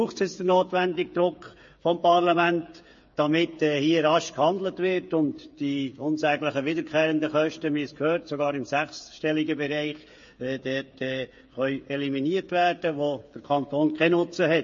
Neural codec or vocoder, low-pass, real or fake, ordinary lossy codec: codec, 16 kHz, 8 kbps, FreqCodec, smaller model; 7.2 kHz; fake; MP3, 32 kbps